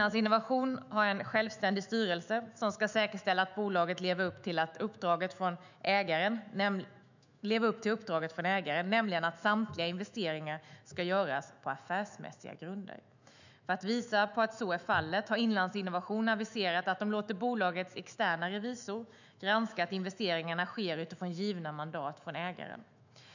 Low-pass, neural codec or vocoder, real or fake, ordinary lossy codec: 7.2 kHz; autoencoder, 48 kHz, 128 numbers a frame, DAC-VAE, trained on Japanese speech; fake; none